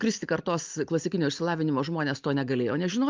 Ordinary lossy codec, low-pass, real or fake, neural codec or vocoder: Opus, 24 kbps; 7.2 kHz; real; none